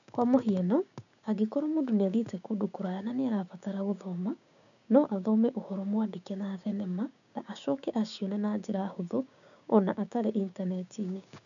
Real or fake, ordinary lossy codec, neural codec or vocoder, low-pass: fake; none; codec, 16 kHz, 6 kbps, DAC; 7.2 kHz